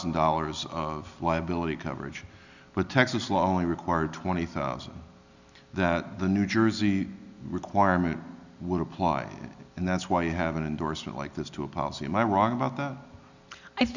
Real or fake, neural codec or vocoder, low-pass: real; none; 7.2 kHz